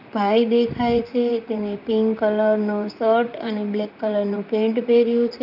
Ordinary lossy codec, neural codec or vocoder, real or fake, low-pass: none; vocoder, 44.1 kHz, 128 mel bands, Pupu-Vocoder; fake; 5.4 kHz